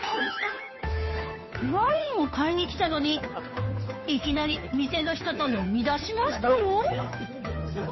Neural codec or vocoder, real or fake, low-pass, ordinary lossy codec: codec, 16 kHz, 2 kbps, FunCodec, trained on Chinese and English, 25 frames a second; fake; 7.2 kHz; MP3, 24 kbps